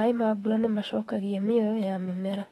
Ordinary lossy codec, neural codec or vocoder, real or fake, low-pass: AAC, 32 kbps; autoencoder, 48 kHz, 32 numbers a frame, DAC-VAE, trained on Japanese speech; fake; 19.8 kHz